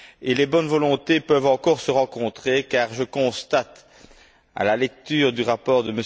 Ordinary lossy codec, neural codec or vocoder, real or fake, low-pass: none; none; real; none